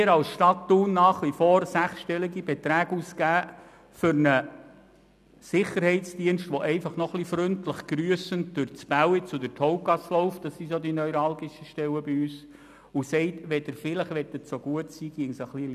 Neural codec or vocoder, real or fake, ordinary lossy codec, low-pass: none; real; none; 14.4 kHz